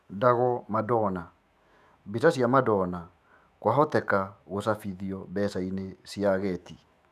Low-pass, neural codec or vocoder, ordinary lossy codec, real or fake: 14.4 kHz; autoencoder, 48 kHz, 128 numbers a frame, DAC-VAE, trained on Japanese speech; none; fake